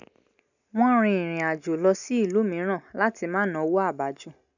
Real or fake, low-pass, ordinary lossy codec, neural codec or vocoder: real; 7.2 kHz; none; none